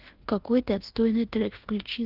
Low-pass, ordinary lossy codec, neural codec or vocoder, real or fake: 5.4 kHz; Opus, 16 kbps; codec, 24 kHz, 1.2 kbps, DualCodec; fake